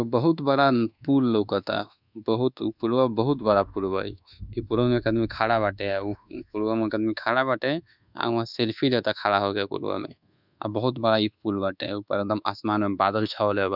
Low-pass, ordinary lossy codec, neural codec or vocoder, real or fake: 5.4 kHz; none; codec, 24 kHz, 1.2 kbps, DualCodec; fake